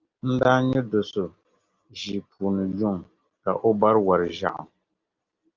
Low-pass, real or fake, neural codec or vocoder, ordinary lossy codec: 7.2 kHz; real; none; Opus, 32 kbps